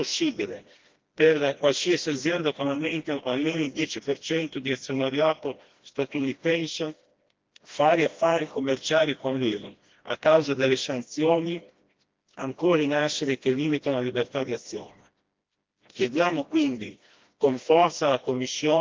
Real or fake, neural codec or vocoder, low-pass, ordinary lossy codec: fake; codec, 16 kHz, 1 kbps, FreqCodec, smaller model; 7.2 kHz; Opus, 32 kbps